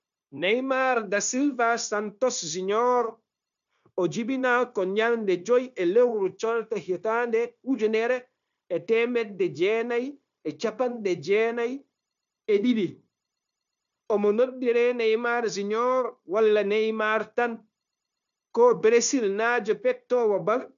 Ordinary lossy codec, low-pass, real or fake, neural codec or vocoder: none; 7.2 kHz; fake; codec, 16 kHz, 0.9 kbps, LongCat-Audio-Codec